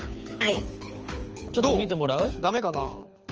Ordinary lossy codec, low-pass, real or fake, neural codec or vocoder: Opus, 24 kbps; 7.2 kHz; fake; codec, 24 kHz, 6 kbps, HILCodec